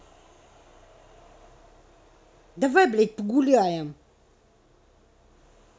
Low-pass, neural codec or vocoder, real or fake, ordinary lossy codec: none; none; real; none